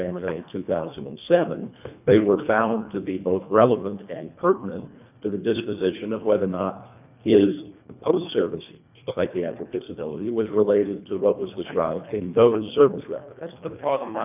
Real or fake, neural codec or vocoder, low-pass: fake; codec, 24 kHz, 1.5 kbps, HILCodec; 3.6 kHz